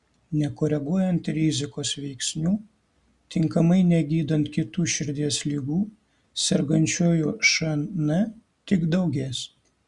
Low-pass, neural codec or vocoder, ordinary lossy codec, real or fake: 10.8 kHz; none; Opus, 64 kbps; real